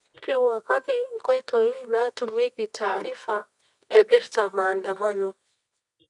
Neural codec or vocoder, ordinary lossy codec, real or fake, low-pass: codec, 24 kHz, 0.9 kbps, WavTokenizer, medium music audio release; none; fake; 10.8 kHz